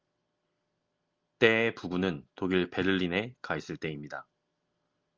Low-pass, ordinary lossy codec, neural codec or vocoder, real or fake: 7.2 kHz; Opus, 24 kbps; none; real